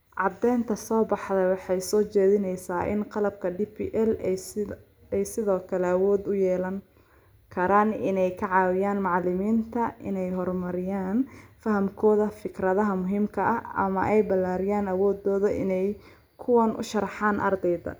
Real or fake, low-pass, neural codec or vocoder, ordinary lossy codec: real; none; none; none